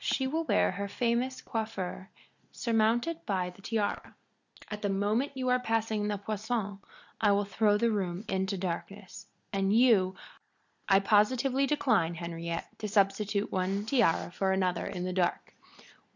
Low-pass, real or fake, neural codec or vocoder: 7.2 kHz; real; none